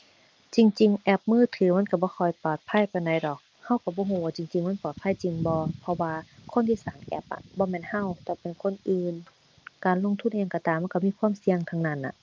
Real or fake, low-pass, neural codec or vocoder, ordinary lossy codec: real; 7.2 kHz; none; Opus, 24 kbps